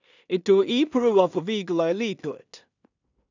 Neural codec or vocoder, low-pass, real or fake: codec, 16 kHz in and 24 kHz out, 0.4 kbps, LongCat-Audio-Codec, two codebook decoder; 7.2 kHz; fake